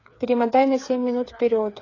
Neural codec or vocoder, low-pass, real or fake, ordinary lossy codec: codec, 16 kHz, 8 kbps, FreqCodec, smaller model; 7.2 kHz; fake; AAC, 32 kbps